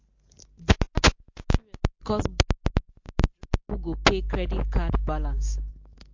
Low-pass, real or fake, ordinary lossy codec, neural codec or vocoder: 7.2 kHz; real; MP3, 48 kbps; none